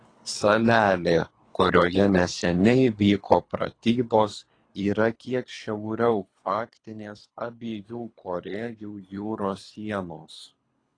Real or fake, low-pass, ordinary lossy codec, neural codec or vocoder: fake; 9.9 kHz; AAC, 32 kbps; codec, 24 kHz, 3 kbps, HILCodec